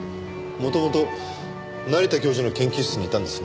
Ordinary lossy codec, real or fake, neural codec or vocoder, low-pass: none; real; none; none